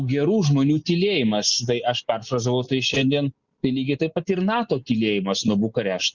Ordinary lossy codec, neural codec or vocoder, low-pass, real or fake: Opus, 64 kbps; none; 7.2 kHz; real